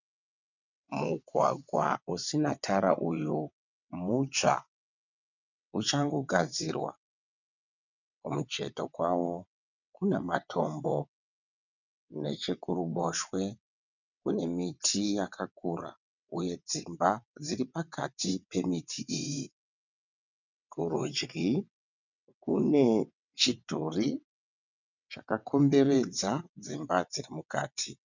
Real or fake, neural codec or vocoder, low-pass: fake; vocoder, 22.05 kHz, 80 mel bands, WaveNeXt; 7.2 kHz